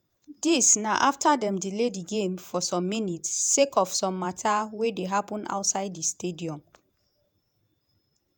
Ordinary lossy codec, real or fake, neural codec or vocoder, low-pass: none; fake; vocoder, 48 kHz, 128 mel bands, Vocos; none